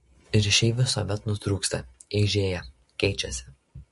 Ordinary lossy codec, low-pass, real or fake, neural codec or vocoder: MP3, 48 kbps; 14.4 kHz; real; none